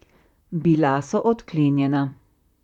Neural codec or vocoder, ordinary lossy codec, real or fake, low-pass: vocoder, 44.1 kHz, 128 mel bands, Pupu-Vocoder; none; fake; 19.8 kHz